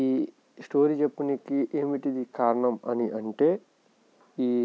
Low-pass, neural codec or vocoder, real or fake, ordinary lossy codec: none; none; real; none